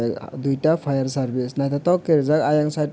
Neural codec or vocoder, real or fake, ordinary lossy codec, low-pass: none; real; none; none